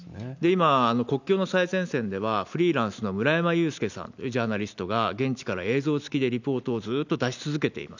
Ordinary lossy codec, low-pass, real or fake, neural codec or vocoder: none; 7.2 kHz; real; none